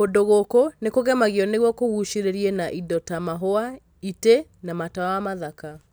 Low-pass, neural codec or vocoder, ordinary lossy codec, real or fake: none; none; none; real